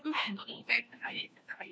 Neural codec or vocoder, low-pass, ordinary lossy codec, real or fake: codec, 16 kHz, 1 kbps, FunCodec, trained on LibriTTS, 50 frames a second; none; none; fake